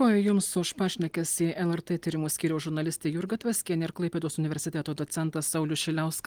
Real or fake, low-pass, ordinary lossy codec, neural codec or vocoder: real; 19.8 kHz; Opus, 24 kbps; none